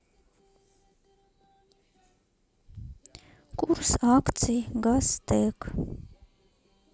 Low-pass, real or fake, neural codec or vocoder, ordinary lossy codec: none; real; none; none